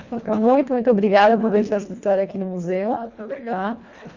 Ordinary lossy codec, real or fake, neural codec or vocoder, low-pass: Opus, 64 kbps; fake; codec, 24 kHz, 1.5 kbps, HILCodec; 7.2 kHz